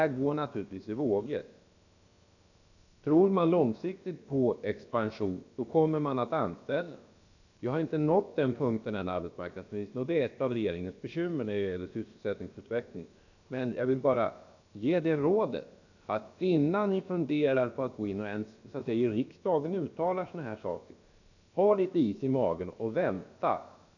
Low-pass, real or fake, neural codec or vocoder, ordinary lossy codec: 7.2 kHz; fake; codec, 16 kHz, about 1 kbps, DyCAST, with the encoder's durations; none